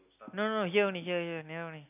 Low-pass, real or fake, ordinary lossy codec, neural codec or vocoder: 3.6 kHz; real; none; none